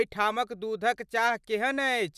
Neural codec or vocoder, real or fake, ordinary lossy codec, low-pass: none; real; none; 14.4 kHz